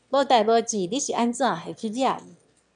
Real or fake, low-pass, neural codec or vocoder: fake; 9.9 kHz; autoencoder, 22.05 kHz, a latent of 192 numbers a frame, VITS, trained on one speaker